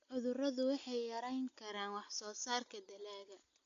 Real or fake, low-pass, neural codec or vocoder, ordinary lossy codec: real; 7.2 kHz; none; AAC, 48 kbps